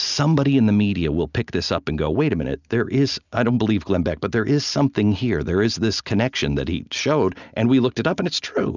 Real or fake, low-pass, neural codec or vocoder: real; 7.2 kHz; none